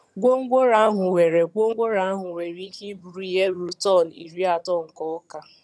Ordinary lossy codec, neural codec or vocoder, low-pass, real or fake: none; vocoder, 22.05 kHz, 80 mel bands, HiFi-GAN; none; fake